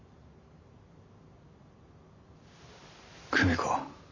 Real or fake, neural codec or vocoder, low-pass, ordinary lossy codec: real; none; 7.2 kHz; none